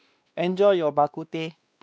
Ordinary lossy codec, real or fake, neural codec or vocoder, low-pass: none; fake; codec, 16 kHz, 2 kbps, X-Codec, WavLM features, trained on Multilingual LibriSpeech; none